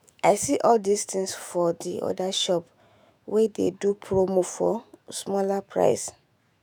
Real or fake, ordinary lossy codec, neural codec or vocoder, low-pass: fake; none; autoencoder, 48 kHz, 128 numbers a frame, DAC-VAE, trained on Japanese speech; none